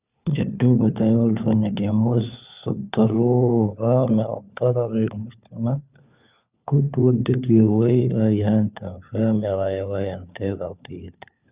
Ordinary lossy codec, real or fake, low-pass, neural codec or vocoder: Opus, 64 kbps; fake; 3.6 kHz; codec, 16 kHz, 4 kbps, FunCodec, trained on LibriTTS, 50 frames a second